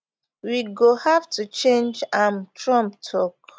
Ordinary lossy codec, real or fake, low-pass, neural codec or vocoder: none; real; none; none